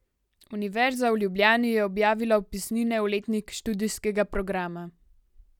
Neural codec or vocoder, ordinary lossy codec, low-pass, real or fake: none; none; 19.8 kHz; real